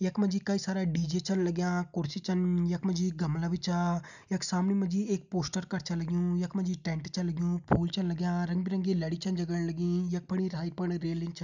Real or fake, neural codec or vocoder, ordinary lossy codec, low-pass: real; none; none; 7.2 kHz